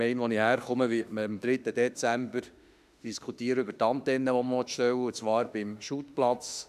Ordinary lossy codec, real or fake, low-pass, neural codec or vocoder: none; fake; 14.4 kHz; autoencoder, 48 kHz, 32 numbers a frame, DAC-VAE, trained on Japanese speech